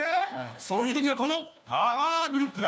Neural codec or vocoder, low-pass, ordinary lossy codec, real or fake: codec, 16 kHz, 1 kbps, FunCodec, trained on LibriTTS, 50 frames a second; none; none; fake